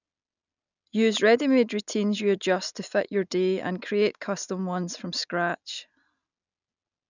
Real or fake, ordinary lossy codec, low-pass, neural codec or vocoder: real; none; 7.2 kHz; none